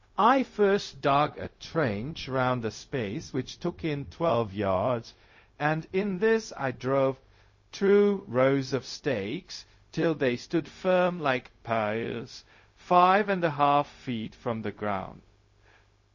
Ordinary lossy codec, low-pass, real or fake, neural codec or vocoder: MP3, 32 kbps; 7.2 kHz; fake; codec, 16 kHz, 0.4 kbps, LongCat-Audio-Codec